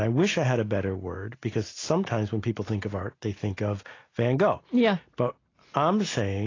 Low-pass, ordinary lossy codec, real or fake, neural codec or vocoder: 7.2 kHz; AAC, 32 kbps; real; none